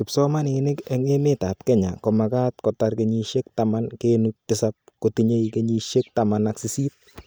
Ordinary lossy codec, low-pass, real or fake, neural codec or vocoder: none; none; real; none